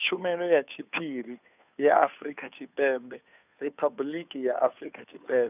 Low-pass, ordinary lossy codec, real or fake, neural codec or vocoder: 3.6 kHz; none; fake; codec, 16 kHz, 2 kbps, FunCodec, trained on Chinese and English, 25 frames a second